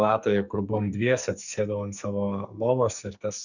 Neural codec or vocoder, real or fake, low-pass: codec, 44.1 kHz, 2.6 kbps, SNAC; fake; 7.2 kHz